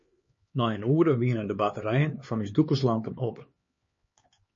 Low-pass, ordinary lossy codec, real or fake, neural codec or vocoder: 7.2 kHz; MP3, 32 kbps; fake; codec, 16 kHz, 4 kbps, X-Codec, HuBERT features, trained on LibriSpeech